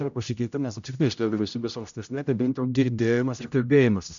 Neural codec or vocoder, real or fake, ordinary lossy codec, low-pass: codec, 16 kHz, 0.5 kbps, X-Codec, HuBERT features, trained on general audio; fake; MP3, 96 kbps; 7.2 kHz